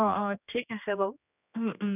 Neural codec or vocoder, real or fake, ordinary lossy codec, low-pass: codec, 16 kHz, 2 kbps, X-Codec, HuBERT features, trained on general audio; fake; none; 3.6 kHz